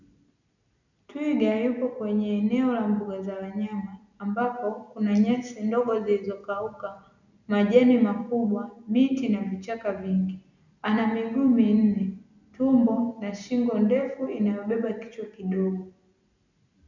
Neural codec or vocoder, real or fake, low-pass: none; real; 7.2 kHz